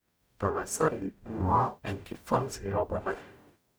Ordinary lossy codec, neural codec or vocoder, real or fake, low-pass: none; codec, 44.1 kHz, 0.9 kbps, DAC; fake; none